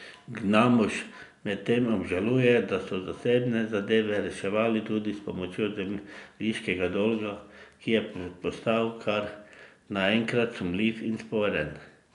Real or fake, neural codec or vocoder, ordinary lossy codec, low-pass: real; none; none; 10.8 kHz